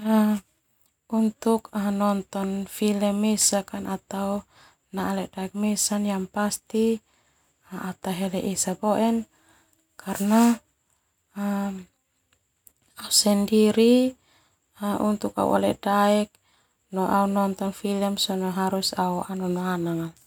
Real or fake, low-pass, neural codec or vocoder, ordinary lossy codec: real; 19.8 kHz; none; none